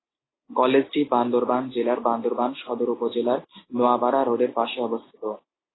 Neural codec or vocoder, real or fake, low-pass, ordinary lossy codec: none; real; 7.2 kHz; AAC, 16 kbps